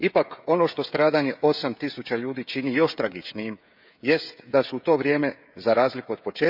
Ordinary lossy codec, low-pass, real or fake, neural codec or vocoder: none; 5.4 kHz; fake; codec, 16 kHz, 16 kbps, FreqCodec, smaller model